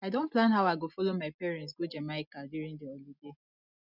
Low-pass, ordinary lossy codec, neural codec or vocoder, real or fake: 5.4 kHz; none; none; real